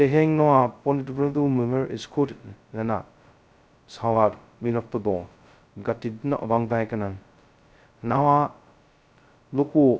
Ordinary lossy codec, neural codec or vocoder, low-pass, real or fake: none; codec, 16 kHz, 0.2 kbps, FocalCodec; none; fake